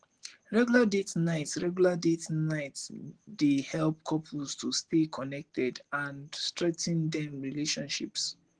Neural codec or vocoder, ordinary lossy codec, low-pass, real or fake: none; Opus, 16 kbps; 9.9 kHz; real